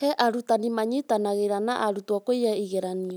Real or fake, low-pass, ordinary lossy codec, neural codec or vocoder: real; none; none; none